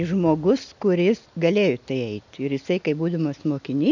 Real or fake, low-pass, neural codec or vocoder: real; 7.2 kHz; none